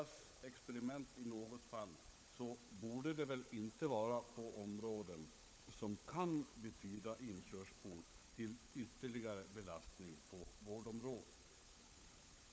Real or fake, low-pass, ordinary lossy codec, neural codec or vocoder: fake; none; none; codec, 16 kHz, 16 kbps, FunCodec, trained on LibriTTS, 50 frames a second